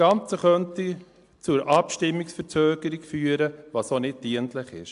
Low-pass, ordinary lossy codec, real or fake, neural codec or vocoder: 10.8 kHz; AAC, 64 kbps; real; none